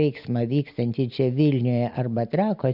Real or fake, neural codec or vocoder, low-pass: real; none; 5.4 kHz